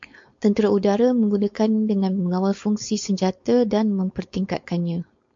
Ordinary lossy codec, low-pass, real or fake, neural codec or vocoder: MP3, 48 kbps; 7.2 kHz; fake; codec, 16 kHz, 4.8 kbps, FACodec